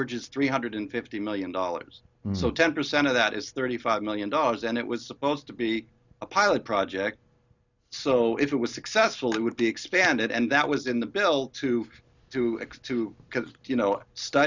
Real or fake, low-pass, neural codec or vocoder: real; 7.2 kHz; none